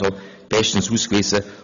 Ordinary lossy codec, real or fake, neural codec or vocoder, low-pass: none; real; none; 7.2 kHz